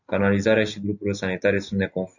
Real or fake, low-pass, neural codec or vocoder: real; 7.2 kHz; none